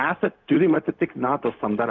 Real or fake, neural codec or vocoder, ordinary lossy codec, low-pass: fake; codec, 16 kHz, 0.4 kbps, LongCat-Audio-Codec; Opus, 24 kbps; 7.2 kHz